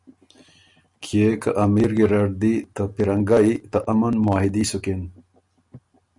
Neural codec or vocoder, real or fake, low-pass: none; real; 10.8 kHz